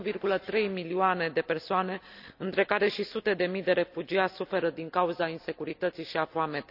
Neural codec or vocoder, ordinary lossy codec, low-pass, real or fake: none; none; 5.4 kHz; real